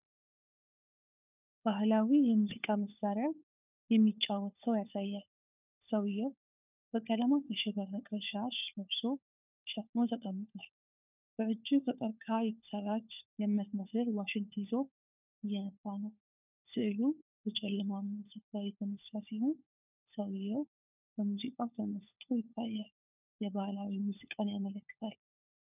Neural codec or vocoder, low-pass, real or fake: codec, 16 kHz, 4 kbps, FunCodec, trained on LibriTTS, 50 frames a second; 3.6 kHz; fake